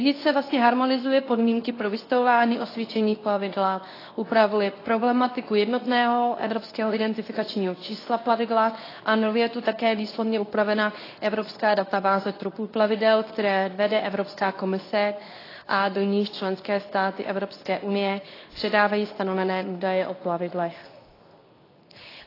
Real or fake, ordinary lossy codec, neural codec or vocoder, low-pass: fake; AAC, 24 kbps; codec, 24 kHz, 0.9 kbps, WavTokenizer, medium speech release version 2; 5.4 kHz